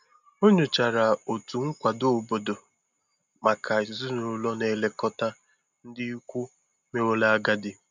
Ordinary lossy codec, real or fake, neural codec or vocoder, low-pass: none; real; none; 7.2 kHz